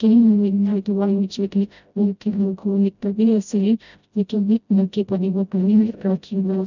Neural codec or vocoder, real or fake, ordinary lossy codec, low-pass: codec, 16 kHz, 0.5 kbps, FreqCodec, smaller model; fake; none; 7.2 kHz